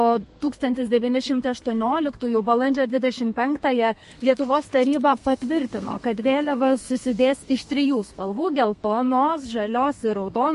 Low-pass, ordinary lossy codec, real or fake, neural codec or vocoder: 14.4 kHz; MP3, 48 kbps; fake; codec, 32 kHz, 1.9 kbps, SNAC